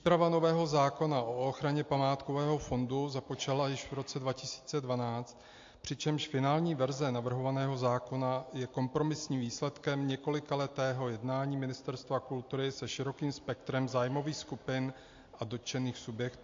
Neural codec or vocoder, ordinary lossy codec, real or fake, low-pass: none; AAC, 48 kbps; real; 7.2 kHz